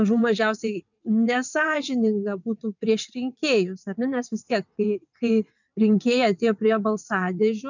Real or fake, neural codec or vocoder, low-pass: fake; vocoder, 22.05 kHz, 80 mel bands, WaveNeXt; 7.2 kHz